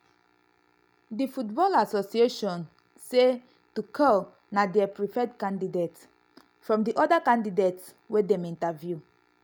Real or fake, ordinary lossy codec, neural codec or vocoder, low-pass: real; none; none; none